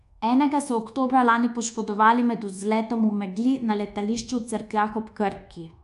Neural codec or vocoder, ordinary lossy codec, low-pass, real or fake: codec, 24 kHz, 1.2 kbps, DualCodec; MP3, 96 kbps; 10.8 kHz; fake